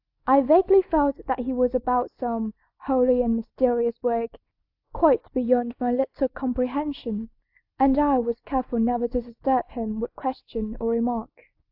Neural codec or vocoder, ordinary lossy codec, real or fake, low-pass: none; AAC, 48 kbps; real; 5.4 kHz